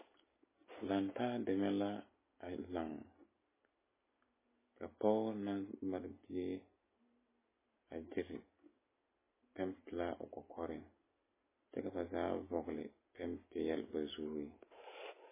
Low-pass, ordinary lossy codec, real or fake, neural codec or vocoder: 3.6 kHz; MP3, 16 kbps; real; none